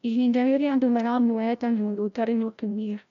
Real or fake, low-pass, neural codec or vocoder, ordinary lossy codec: fake; 7.2 kHz; codec, 16 kHz, 0.5 kbps, FreqCodec, larger model; none